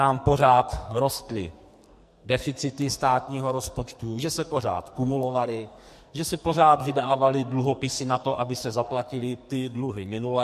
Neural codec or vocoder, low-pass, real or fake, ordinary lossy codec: codec, 44.1 kHz, 2.6 kbps, SNAC; 14.4 kHz; fake; MP3, 64 kbps